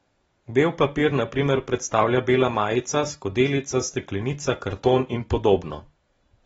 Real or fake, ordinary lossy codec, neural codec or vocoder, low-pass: real; AAC, 24 kbps; none; 19.8 kHz